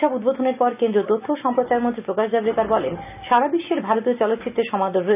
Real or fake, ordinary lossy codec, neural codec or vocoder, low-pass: real; none; none; 3.6 kHz